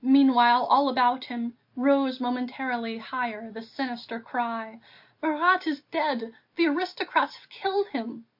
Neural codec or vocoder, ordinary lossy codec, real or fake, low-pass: none; MP3, 32 kbps; real; 5.4 kHz